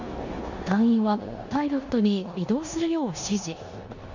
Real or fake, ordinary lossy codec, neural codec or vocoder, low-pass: fake; none; codec, 16 kHz in and 24 kHz out, 0.9 kbps, LongCat-Audio-Codec, four codebook decoder; 7.2 kHz